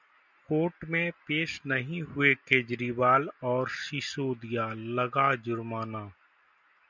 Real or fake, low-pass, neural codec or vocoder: real; 7.2 kHz; none